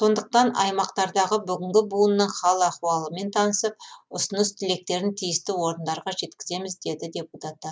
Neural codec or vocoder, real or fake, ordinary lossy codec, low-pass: none; real; none; none